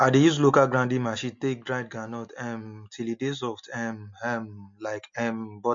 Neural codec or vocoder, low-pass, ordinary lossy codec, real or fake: none; 7.2 kHz; MP3, 48 kbps; real